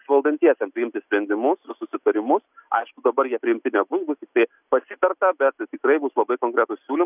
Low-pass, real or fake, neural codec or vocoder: 3.6 kHz; real; none